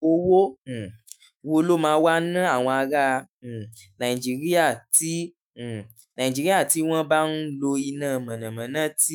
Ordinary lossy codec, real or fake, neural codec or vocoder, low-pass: none; fake; autoencoder, 48 kHz, 128 numbers a frame, DAC-VAE, trained on Japanese speech; none